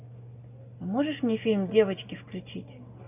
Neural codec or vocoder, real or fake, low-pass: none; real; 3.6 kHz